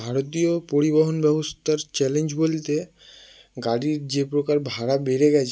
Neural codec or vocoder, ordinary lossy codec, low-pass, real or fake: none; none; none; real